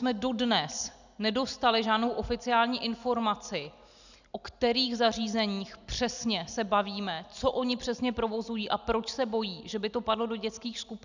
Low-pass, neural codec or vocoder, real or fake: 7.2 kHz; none; real